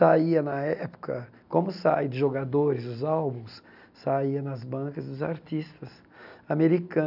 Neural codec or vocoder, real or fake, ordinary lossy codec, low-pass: none; real; none; 5.4 kHz